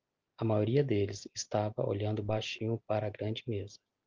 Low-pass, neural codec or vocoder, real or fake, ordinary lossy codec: 7.2 kHz; none; real; Opus, 32 kbps